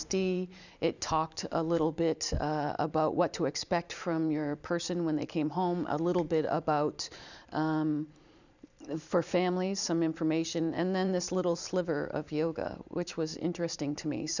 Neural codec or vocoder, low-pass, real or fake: vocoder, 44.1 kHz, 128 mel bands every 512 samples, BigVGAN v2; 7.2 kHz; fake